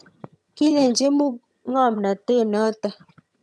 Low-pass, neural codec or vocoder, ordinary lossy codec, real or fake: none; vocoder, 22.05 kHz, 80 mel bands, HiFi-GAN; none; fake